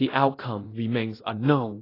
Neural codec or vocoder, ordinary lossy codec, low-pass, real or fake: codec, 24 kHz, 0.9 kbps, DualCodec; AAC, 24 kbps; 5.4 kHz; fake